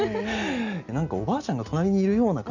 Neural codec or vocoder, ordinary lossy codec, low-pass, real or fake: none; none; 7.2 kHz; real